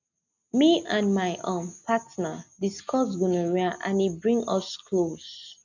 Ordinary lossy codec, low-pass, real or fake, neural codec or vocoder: none; 7.2 kHz; real; none